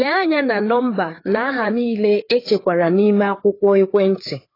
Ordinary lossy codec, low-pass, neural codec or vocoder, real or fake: AAC, 24 kbps; 5.4 kHz; codec, 16 kHz, 4 kbps, FreqCodec, larger model; fake